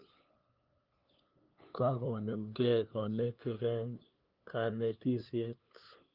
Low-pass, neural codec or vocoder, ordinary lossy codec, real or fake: 5.4 kHz; codec, 16 kHz, 2 kbps, FunCodec, trained on LibriTTS, 25 frames a second; Opus, 32 kbps; fake